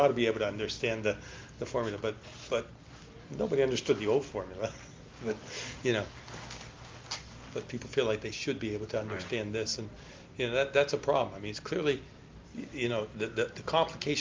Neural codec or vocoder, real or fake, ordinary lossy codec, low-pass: none; real; Opus, 32 kbps; 7.2 kHz